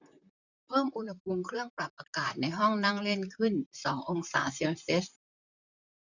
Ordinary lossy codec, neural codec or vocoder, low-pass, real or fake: none; vocoder, 44.1 kHz, 128 mel bands, Pupu-Vocoder; 7.2 kHz; fake